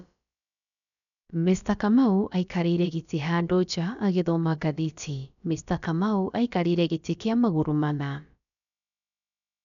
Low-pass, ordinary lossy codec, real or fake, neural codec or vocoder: 7.2 kHz; none; fake; codec, 16 kHz, about 1 kbps, DyCAST, with the encoder's durations